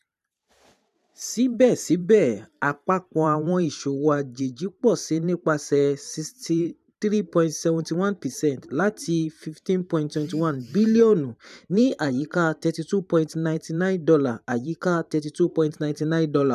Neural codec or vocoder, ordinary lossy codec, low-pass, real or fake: vocoder, 44.1 kHz, 128 mel bands every 512 samples, BigVGAN v2; none; 14.4 kHz; fake